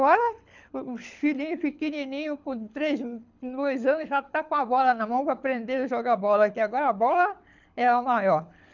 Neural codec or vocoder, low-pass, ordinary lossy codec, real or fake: codec, 24 kHz, 6 kbps, HILCodec; 7.2 kHz; none; fake